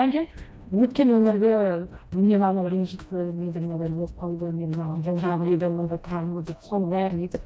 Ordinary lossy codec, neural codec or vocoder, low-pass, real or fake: none; codec, 16 kHz, 1 kbps, FreqCodec, smaller model; none; fake